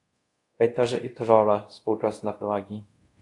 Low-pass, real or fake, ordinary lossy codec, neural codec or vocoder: 10.8 kHz; fake; AAC, 48 kbps; codec, 24 kHz, 0.5 kbps, DualCodec